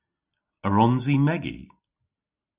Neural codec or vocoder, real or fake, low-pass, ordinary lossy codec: none; real; 3.6 kHz; Opus, 64 kbps